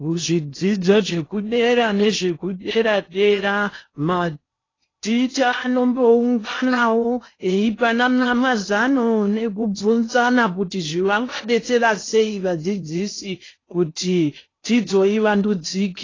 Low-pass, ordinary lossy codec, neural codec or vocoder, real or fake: 7.2 kHz; AAC, 32 kbps; codec, 16 kHz in and 24 kHz out, 0.6 kbps, FocalCodec, streaming, 2048 codes; fake